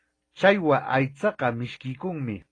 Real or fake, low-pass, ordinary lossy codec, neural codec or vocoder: real; 9.9 kHz; AAC, 32 kbps; none